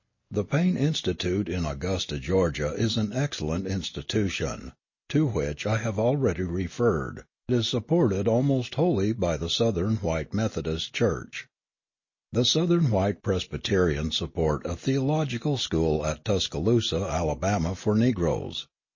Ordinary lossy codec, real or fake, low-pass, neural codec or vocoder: MP3, 32 kbps; real; 7.2 kHz; none